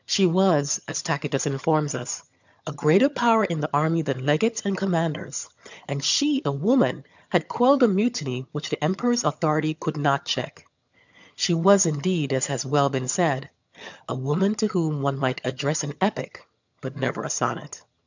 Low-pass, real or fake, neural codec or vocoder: 7.2 kHz; fake; vocoder, 22.05 kHz, 80 mel bands, HiFi-GAN